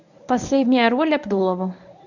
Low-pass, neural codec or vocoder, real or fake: 7.2 kHz; codec, 24 kHz, 0.9 kbps, WavTokenizer, medium speech release version 1; fake